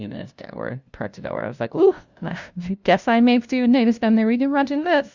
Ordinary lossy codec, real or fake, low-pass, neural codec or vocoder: Opus, 64 kbps; fake; 7.2 kHz; codec, 16 kHz, 0.5 kbps, FunCodec, trained on LibriTTS, 25 frames a second